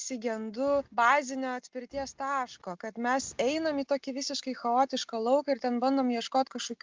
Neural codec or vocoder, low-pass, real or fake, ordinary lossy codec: none; 7.2 kHz; real; Opus, 24 kbps